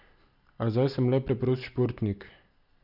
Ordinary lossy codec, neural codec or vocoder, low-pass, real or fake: none; none; 5.4 kHz; real